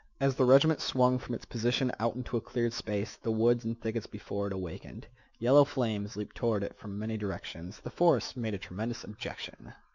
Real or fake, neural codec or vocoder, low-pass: fake; autoencoder, 48 kHz, 128 numbers a frame, DAC-VAE, trained on Japanese speech; 7.2 kHz